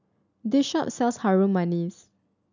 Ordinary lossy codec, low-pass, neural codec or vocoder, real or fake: none; 7.2 kHz; none; real